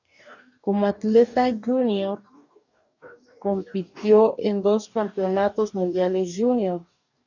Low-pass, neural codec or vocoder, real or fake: 7.2 kHz; codec, 44.1 kHz, 2.6 kbps, DAC; fake